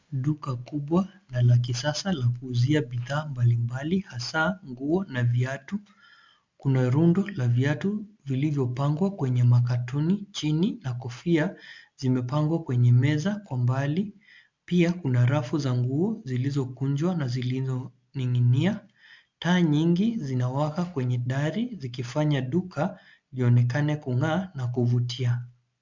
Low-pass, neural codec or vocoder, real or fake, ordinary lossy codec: 7.2 kHz; none; real; MP3, 64 kbps